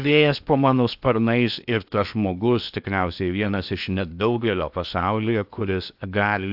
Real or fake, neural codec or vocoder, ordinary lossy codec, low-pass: fake; codec, 16 kHz in and 24 kHz out, 0.8 kbps, FocalCodec, streaming, 65536 codes; AAC, 48 kbps; 5.4 kHz